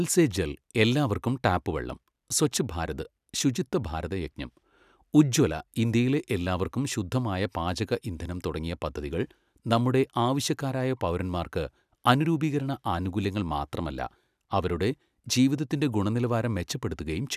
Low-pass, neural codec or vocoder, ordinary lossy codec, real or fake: 14.4 kHz; none; none; real